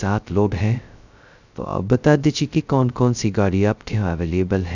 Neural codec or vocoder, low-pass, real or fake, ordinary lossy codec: codec, 16 kHz, 0.2 kbps, FocalCodec; 7.2 kHz; fake; none